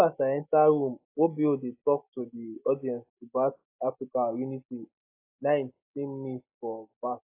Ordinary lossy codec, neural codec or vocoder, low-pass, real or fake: AAC, 24 kbps; none; 3.6 kHz; real